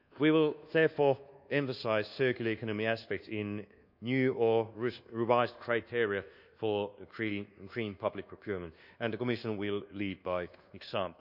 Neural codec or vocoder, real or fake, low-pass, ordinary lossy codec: codec, 24 kHz, 1.2 kbps, DualCodec; fake; 5.4 kHz; none